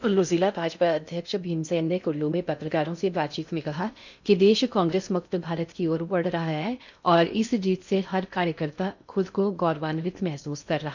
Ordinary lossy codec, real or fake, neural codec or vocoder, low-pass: none; fake; codec, 16 kHz in and 24 kHz out, 0.6 kbps, FocalCodec, streaming, 2048 codes; 7.2 kHz